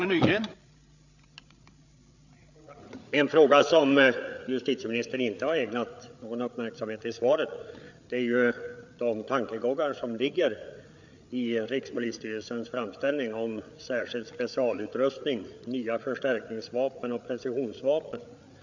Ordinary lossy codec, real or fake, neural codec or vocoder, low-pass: none; fake; codec, 16 kHz, 8 kbps, FreqCodec, larger model; 7.2 kHz